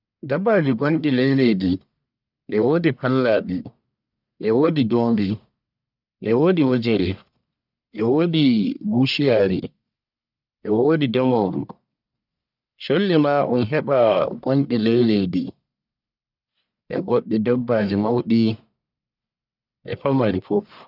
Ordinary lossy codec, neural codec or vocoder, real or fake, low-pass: none; codec, 44.1 kHz, 1.7 kbps, Pupu-Codec; fake; 5.4 kHz